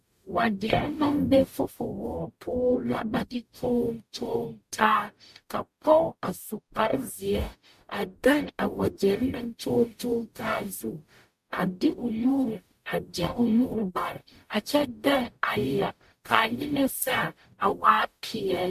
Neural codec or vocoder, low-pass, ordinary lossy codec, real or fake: codec, 44.1 kHz, 0.9 kbps, DAC; 14.4 kHz; MP3, 64 kbps; fake